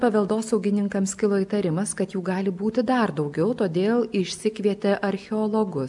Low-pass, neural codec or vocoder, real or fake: 10.8 kHz; none; real